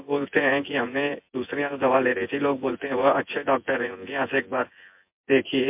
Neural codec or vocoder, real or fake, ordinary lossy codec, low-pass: vocoder, 24 kHz, 100 mel bands, Vocos; fake; MP3, 32 kbps; 3.6 kHz